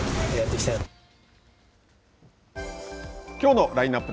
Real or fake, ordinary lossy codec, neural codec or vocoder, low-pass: real; none; none; none